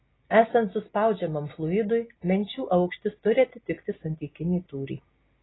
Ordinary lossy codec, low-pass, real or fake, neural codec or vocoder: AAC, 16 kbps; 7.2 kHz; real; none